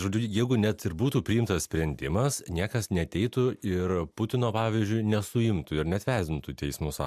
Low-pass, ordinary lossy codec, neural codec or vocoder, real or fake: 14.4 kHz; MP3, 96 kbps; none; real